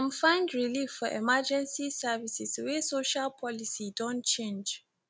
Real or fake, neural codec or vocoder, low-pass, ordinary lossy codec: real; none; none; none